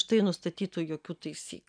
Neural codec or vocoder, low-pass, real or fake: none; 9.9 kHz; real